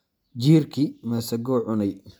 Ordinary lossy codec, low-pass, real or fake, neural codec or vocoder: none; none; real; none